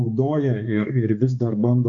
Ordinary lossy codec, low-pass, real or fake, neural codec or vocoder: MP3, 96 kbps; 7.2 kHz; fake; codec, 16 kHz, 2 kbps, X-Codec, HuBERT features, trained on balanced general audio